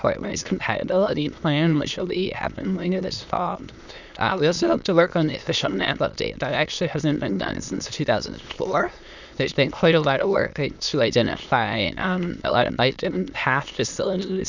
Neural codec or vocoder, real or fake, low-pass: autoencoder, 22.05 kHz, a latent of 192 numbers a frame, VITS, trained on many speakers; fake; 7.2 kHz